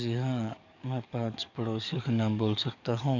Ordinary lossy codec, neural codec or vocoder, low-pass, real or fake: none; codec, 44.1 kHz, 7.8 kbps, DAC; 7.2 kHz; fake